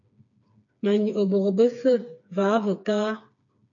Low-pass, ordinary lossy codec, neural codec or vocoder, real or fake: 7.2 kHz; MP3, 96 kbps; codec, 16 kHz, 4 kbps, FreqCodec, smaller model; fake